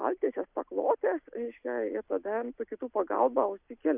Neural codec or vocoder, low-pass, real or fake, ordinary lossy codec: none; 3.6 kHz; real; Opus, 64 kbps